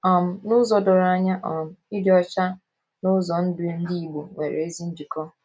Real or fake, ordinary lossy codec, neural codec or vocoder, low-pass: real; none; none; none